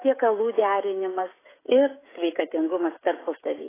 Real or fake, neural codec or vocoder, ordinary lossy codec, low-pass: fake; codec, 16 kHz, 16 kbps, FreqCodec, smaller model; AAC, 16 kbps; 3.6 kHz